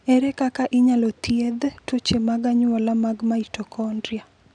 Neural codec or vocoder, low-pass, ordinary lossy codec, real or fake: none; 9.9 kHz; none; real